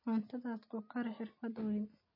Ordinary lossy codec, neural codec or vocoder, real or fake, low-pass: MP3, 24 kbps; none; real; 5.4 kHz